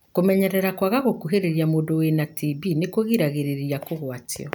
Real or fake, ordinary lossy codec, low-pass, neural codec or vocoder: real; none; none; none